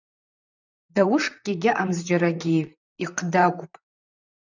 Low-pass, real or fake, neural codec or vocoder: 7.2 kHz; fake; vocoder, 44.1 kHz, 128 mel bands, Pupu-Vocoder